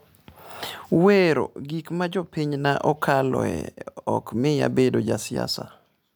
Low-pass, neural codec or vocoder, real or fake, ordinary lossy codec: none; none; real; none